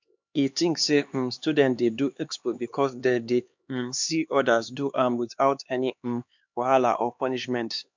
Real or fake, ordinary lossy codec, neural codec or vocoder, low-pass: fake; MP3, 64 kbps; codec, 16 kHz, 2 kbps, X-Codec, HuBERT features, trained on LibriSpeech; 7.2 kHz